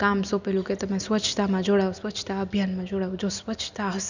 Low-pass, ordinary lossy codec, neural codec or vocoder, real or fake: 7.2 kHz; none; none; real